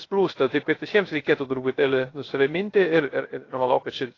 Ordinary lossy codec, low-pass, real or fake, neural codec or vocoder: AAC, 32 kbps; 7.2 kHz; fake; codec, 16 kHz, 0.3 kbps, FocalCodec